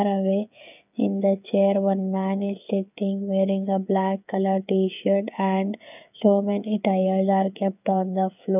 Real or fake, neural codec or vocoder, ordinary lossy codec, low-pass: fake; codec, 16 kHz, 8 kbps, FreqCodec, smaller model; none; 3.6 kHz